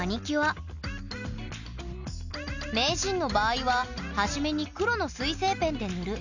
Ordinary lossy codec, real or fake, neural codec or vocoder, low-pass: none; real; none; 7.2 kHz